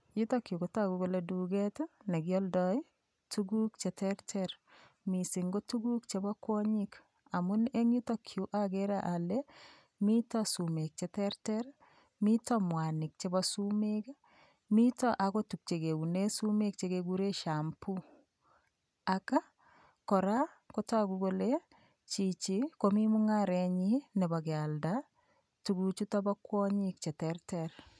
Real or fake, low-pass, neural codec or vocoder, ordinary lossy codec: real; none; none; none